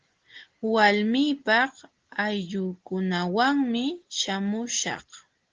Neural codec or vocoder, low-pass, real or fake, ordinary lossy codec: none; 7.2 kHz; real; Opus, 16 kbps